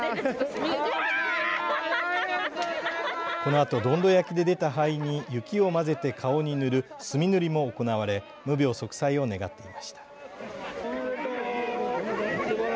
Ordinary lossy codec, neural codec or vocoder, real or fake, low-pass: none; none; real; none